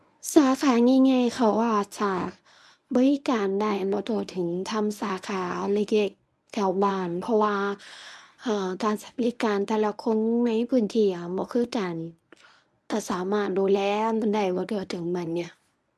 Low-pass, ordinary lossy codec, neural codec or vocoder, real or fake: none; none; codec, 24 kHz, 0.9 kbps, WavTokenizer, medium speech release version 1; fake